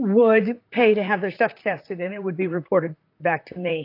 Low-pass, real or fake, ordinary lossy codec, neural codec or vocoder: 5.4 kHz; fake; AAC, 32 kbps; vocoder, 44.1 kHz, 128 mel bands, Pupu-Vocoder